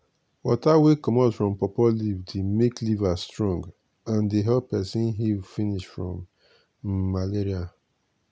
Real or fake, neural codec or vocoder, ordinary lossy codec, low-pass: real; none; none; none